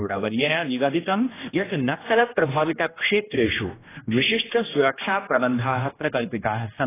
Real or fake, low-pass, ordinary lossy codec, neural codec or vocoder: fake; 3.6 kHz; AAC, 16 kbps; codec, 16 kHz, 1 kbps, X-Codec, HuBERT features, trained on general audio